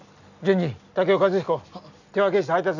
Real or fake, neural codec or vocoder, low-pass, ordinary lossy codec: real; none; 7.2 kHz; none